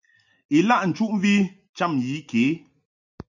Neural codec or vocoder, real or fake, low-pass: none; real; 7.2 kHz